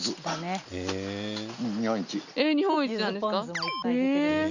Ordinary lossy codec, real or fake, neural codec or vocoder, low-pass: none; real; none; 7.2 kHz